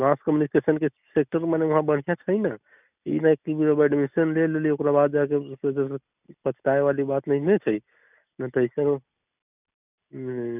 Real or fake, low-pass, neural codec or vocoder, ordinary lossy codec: real; 3.6 kHz; none; none